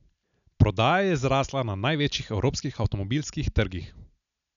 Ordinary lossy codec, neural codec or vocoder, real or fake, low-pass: none; none; real; 7.2 kHz